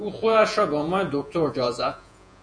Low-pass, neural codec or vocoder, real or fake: 9.9 kHz; vocoder, 48 kHz, 128 mel bands, Vocos; fake